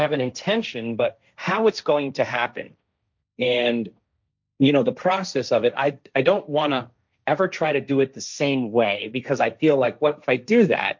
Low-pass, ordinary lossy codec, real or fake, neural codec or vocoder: 7.2 kHz; MP3, 64 kbps; fake; codec, 16 kHz, 1.1 kbps, Voila-Tokenizer